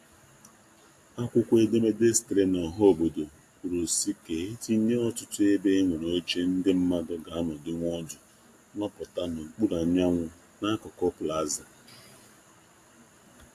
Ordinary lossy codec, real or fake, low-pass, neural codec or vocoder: AAC, 64 kbps; real; 14.4 kHz; none